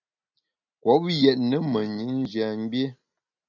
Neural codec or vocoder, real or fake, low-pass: none; real; 7.2 kHz